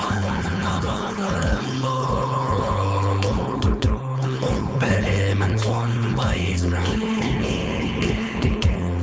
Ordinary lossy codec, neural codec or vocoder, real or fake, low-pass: none; codec, 16 kHz, 4.8 kbps, FACodec; fake; none